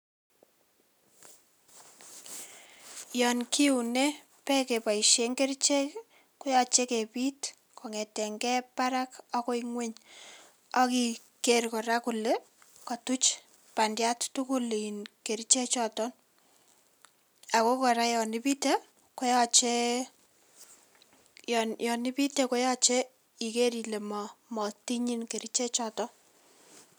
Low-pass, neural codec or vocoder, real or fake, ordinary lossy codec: none; none; real; none